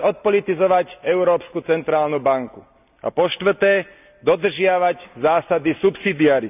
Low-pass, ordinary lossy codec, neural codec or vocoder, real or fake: 3.6 kHz; none; none; real